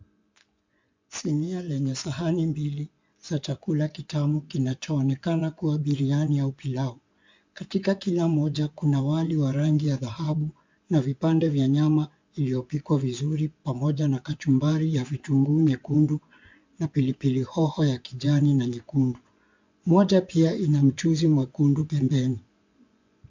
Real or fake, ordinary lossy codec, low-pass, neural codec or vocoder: fake; MP3, 64 kbps; 7.2 kHz; vocoder, 24 kHz, 100 mel bands, Vocos